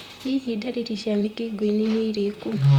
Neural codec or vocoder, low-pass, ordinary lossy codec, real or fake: vocoder, 44.1 kHz, 128 mel bands, Pupu-Vocoder; 19.8 kHz; Opus, 64 kbps; fake